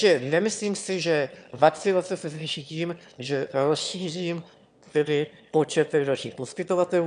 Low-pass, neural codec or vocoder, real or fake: 9.9 kHz; autoencoder, 22.05 kHz, a latent of 192 numbers a frame, VITS, trained on one speaker; fake